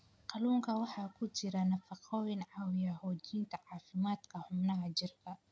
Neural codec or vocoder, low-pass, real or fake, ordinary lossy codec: none; none; real; none